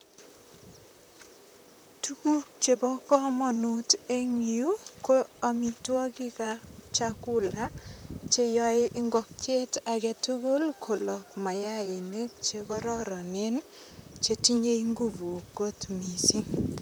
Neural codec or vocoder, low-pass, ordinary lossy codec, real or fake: vocoder, 44.1 kHz, 128 mel bands, Pupu-Vocoder; none; none; fake